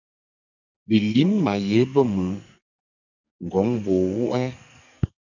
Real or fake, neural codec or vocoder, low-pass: fake; codec, 44.1 kHz, 2.6 kbps, SNAC; 7.2 kHz